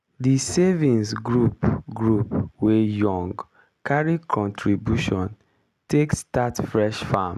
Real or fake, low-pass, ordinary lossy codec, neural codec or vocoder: real; 14.4 kHz; none; none